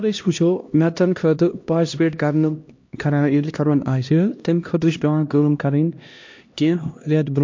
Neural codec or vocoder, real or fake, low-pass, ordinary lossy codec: codec, 16 kHz, 1 kbps, X-Codec, HuBERT features, trained on LibriSpeech; fake; 7.2 kHz; MP3, 48 kbps